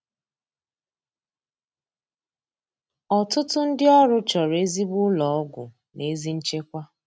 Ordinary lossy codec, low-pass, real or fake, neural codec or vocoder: none; none; real; none